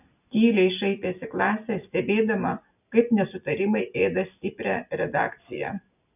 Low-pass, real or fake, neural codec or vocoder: 3.6 kHz; real; none